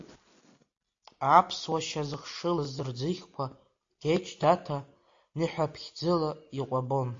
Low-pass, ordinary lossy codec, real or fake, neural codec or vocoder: 7.2 kHz; AAC, 48 kbps; real; none